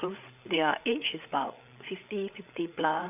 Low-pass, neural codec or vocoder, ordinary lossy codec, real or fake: 3.6 kHz; codec, 16 kHz, 8 kbps, FreqCodec, larger model; none; fake